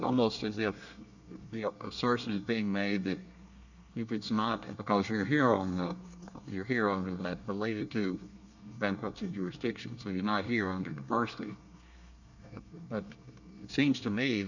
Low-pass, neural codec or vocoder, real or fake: 7.2 kHz; codec, 24 kHz, 1 kbps, SNAC; fake